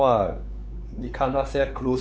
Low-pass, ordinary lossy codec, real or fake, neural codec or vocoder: none; none; fake; codec, 16 kHz, 4 kbps, X-Codec, WavLM features, trained on Multilingual LibriSpeech